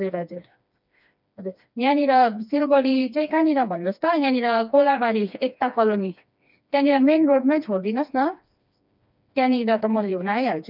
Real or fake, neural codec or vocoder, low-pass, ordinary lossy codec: fake; codec, 16 kHz, 2 kbps, FreqCodec, smaller model; 5.4 kHz; none